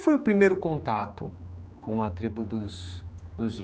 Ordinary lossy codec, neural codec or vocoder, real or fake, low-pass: none; codec, 16 kHz, 2 kbps, X-Codec, HuBERT features, trained on general audio; fake; none